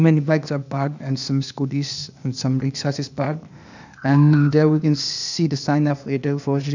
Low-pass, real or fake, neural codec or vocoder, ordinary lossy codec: 7.2 kHz; fake; codec, 16 kHz, 0.8 kbps, ZipCodec; none